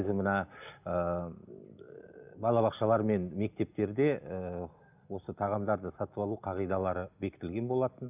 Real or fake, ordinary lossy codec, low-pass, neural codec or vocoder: real; none; 3.6 kHz; none